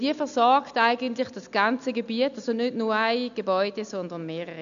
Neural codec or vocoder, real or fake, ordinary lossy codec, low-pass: none; real; none; 7.2 kHz